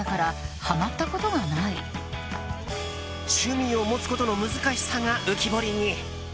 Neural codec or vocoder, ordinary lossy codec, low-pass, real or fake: none; none; none; real